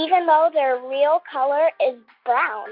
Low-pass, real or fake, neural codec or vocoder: 5.4 kHz; real; none